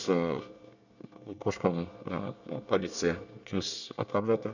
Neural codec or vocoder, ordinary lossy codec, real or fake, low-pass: codec, 24 kHz, 1 kbps, SNAC; none; fake; 7.2 kHz